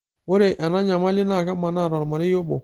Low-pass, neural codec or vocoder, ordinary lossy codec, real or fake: 19.8 kHz; none; Opus, 16 kbps; real